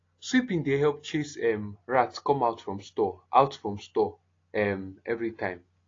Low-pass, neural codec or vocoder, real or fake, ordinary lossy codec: 7.2 kHz; none; real; AAC, 32 kbps